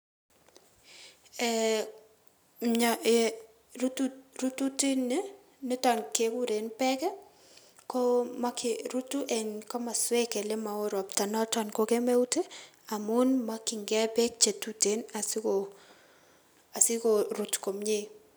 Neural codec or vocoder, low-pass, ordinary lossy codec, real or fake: none; none; none; real